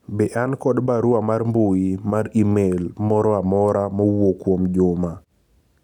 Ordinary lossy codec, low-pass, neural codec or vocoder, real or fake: none; 19.8 kHz; none; real